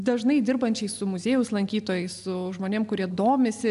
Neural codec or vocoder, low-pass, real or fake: none; 10.8 kHz; real